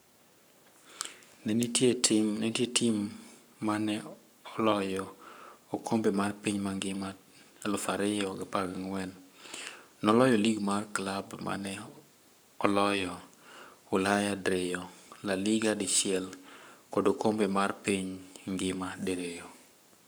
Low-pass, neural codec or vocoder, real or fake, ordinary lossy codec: none; codec, 44.1 kHz, 7.8 kbps, Pupu-Codec; fake; none